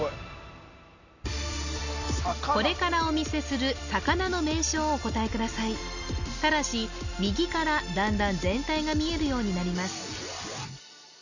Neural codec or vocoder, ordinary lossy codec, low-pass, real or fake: none; none; 7.2 kHz; real